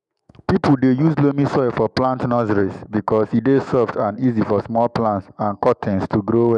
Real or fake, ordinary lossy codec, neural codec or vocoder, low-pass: fake; none; autoencoder, 48 kHz, 128 numbers a frame, DAC-VAE, trained on Japanese speech; 10.8 kHz